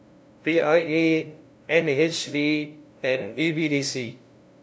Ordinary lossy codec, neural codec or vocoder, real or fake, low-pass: none; codec, 16 kHz, 0.5 kbps, FunCodec, trained on LibriTTS, 25 frames a second; fake; none